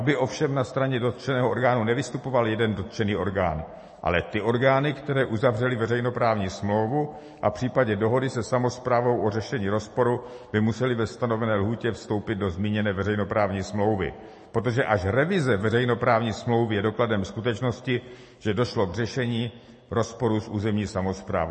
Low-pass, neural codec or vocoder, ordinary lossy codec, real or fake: 10.8 kHz; none; MP3, 32 kbps; real